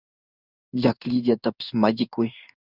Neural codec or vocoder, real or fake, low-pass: codec, 16 kHz in and 24 kHz out, 1 kbps, XY-Tokenizer; fake; 5.4 kHz